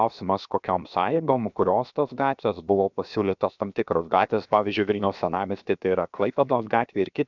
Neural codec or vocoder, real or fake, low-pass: codec, 16 kHz, 0.7 kbps, FocalCodec; fake; 7.2 kHz